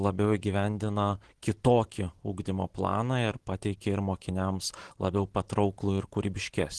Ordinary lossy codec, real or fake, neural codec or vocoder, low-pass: Opus, 16 kbps; real; none; 10.8 kHz